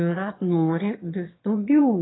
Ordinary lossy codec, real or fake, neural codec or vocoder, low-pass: AAC, 16 kbps; fake; autoencoder, 22.05 kHz, a latent of 192 numbers a frame, VITS, trained on one speaker; 7.2 kHz